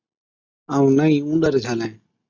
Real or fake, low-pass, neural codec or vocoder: real; 7.2 kHz; none